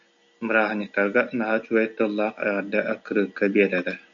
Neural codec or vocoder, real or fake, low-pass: none; real; 7.2 kHz